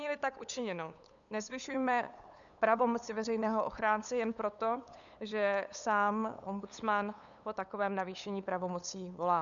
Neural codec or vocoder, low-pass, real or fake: codec, 16 kHz, 8 kbps, FunCodec, trained on LibriTTS, 25 frames a second; 7.2 kHz; fake